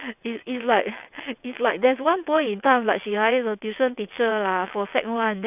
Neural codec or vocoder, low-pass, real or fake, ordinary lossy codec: vocoder, 22.05 kHz, 80 mel bands, WaveNeXt; 3.6 kHz; fake; none